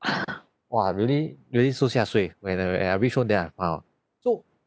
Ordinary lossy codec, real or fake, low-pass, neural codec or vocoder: none; real; none; none